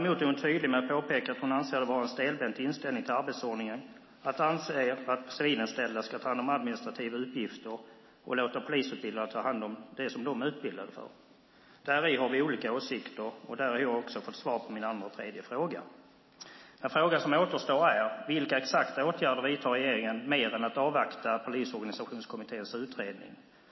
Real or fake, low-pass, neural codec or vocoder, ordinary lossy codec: real; 7.2 kHz; none; MP3, 24 kbps